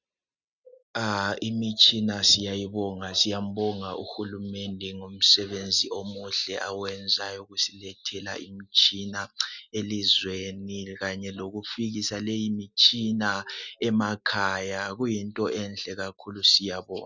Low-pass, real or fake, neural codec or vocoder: 7.2 kHz; real; none